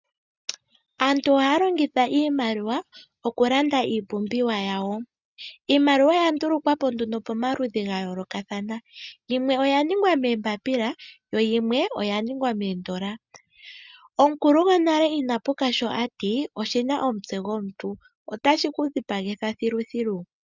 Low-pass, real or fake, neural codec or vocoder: 7.2 kHz; real; none